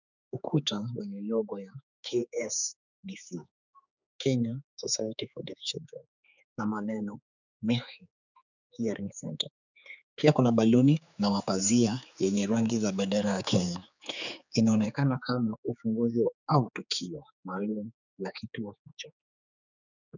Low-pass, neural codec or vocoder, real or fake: 7.2 kHz; codec, 16 kHz, 4 kbps, X-Codec, HuBERT features, trained on general audio; fake